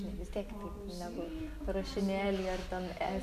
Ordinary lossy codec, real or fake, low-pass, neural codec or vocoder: AAC, 96 kbps; real; 14.4 kHz; none